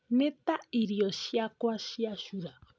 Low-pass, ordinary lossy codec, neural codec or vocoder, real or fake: none; none; none; real